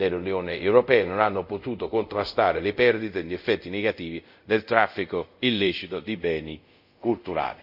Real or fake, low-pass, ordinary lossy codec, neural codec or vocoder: fake; 5.4 kHz; none; codec, 24 kHz, 0.5 kbps, DualCodec